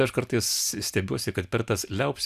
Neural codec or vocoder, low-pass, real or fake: none; 14.4 kHz; real